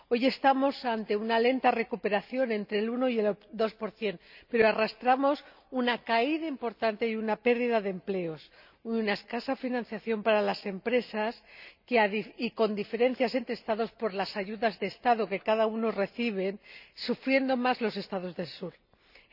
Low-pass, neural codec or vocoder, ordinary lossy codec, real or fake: 5.4 kHz; none; none; real